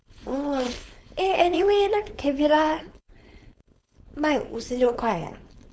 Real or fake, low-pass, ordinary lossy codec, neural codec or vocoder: fake; none; none; codec, 16 kHz, 4.8 kbps, FACodec